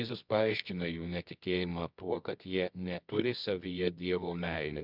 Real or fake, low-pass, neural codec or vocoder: fake; 5.4 kHz; codec, 24 kHz, 0.9 kbps, WavTokenizer, medium music audio release